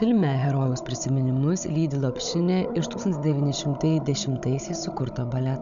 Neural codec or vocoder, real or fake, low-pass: codec, 16 kHz, 16 kbps, FunCodec, trained on Chinese and English, 50 frames a second; fake; 7.2 kHz